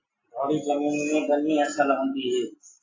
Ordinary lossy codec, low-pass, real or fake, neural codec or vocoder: AAC, 32 kbps; 7.2 kHz; real; none